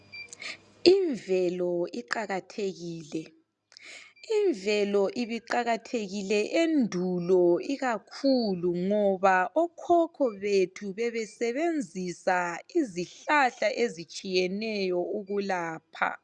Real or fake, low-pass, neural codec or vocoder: real; 10.8 kHz; none